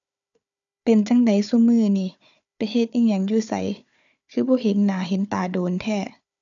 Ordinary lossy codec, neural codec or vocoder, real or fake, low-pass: none; codec, 16 kHz, 4 kbps, FunCodec, trained on Chinese and English, 50 frames a second; fake; 7.2 kHz